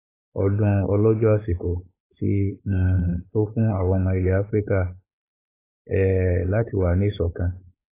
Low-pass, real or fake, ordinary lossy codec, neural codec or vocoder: 3.6 kHz; fake; AAC, 24 kbps; codec, 16 kHz, 4.8 kbps, FACodec